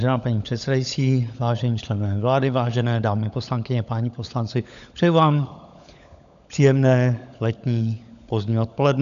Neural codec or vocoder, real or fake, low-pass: codec, 16 kHz, 16 kbps, FunCodec, trained on LibriTTS, 50 frames a second; fake; 7.2 kHz